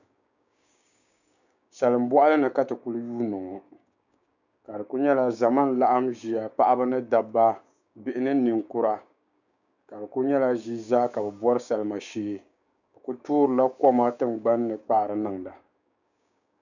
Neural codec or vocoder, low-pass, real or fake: codec, 16 kHz, 6 kbps, DAC; 7.2 kHz; fake